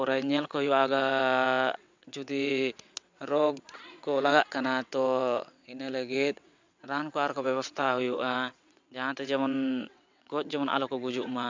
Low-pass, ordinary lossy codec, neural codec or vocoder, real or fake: 7.2 kHz; MP3, 64 kbps; vocoder, 22.05 kHz, 80 mel bands, WaveNeXt; fake